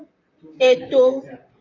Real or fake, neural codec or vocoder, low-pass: fake; vocoder, 44.1 kHz, 128 mel bands, Pupu-Vocoder; 7.2 kHz